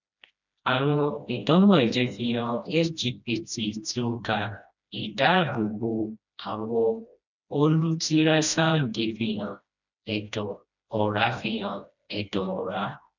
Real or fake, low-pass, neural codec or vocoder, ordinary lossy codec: fake; 7.2 kHz; codec, 16 kHz, 1 kbps, FreqCodec, smaller model; none